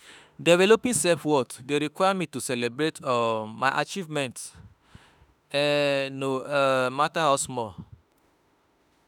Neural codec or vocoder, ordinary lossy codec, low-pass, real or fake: autoencoder, 48 kHz, 32 numbers a frame, DAC-VAE, trained on Japanese speech; none; none; fake